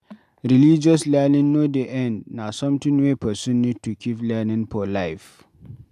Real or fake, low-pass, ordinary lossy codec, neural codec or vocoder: real; 14.4 kHz; AAC, 96 kbps; none